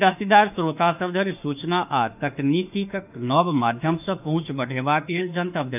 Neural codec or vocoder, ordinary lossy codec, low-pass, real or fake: autoencoder, 48 kHz, 32 numbers a frame, DAC-VAE, trained on Japanese speech; none; 3.6 kHz; fake